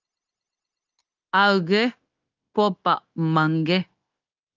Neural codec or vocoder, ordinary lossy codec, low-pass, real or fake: codec, 16 kHz, 0.9 kbps, LongCat-Audio-Codec; Opus, 32 kbps; 7.2 kHz; fake